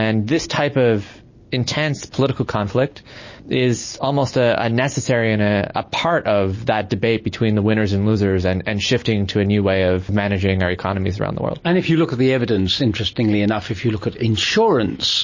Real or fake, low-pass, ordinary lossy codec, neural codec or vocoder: real; 7.2 kHz; MP3, 32 kbps; none